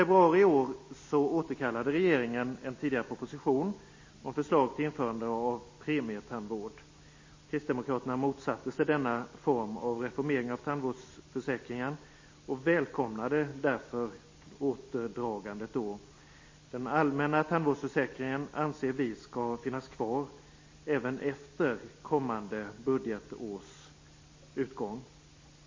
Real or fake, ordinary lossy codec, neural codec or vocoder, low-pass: real; MP3, 32 kbps; none; 7.2 kHz